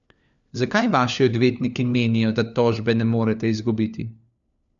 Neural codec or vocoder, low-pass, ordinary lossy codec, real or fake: codec, 16 kHz, 4 kbps, FunCodec, trained on LibriTTS, 50 frames a second; 7.2 kHz; none; fake